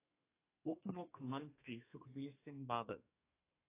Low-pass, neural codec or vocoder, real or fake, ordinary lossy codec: 3.6 kHz; codec, 32 kHz, 1.9 kbps, SNAC; fake; AAC, 24 kbps